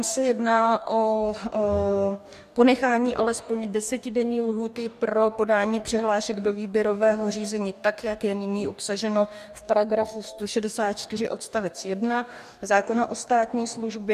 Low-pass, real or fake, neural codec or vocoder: 14.4 kHz; fake; codec, 44.1 kHz, 2.6 kbps, DAC